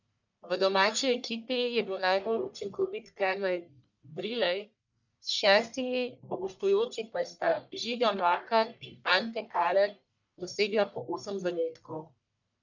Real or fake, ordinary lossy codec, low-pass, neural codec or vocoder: fake; none; 7.2 kHz; codec, 44.1 kHz, 1.7 kbps, Pupu-Codec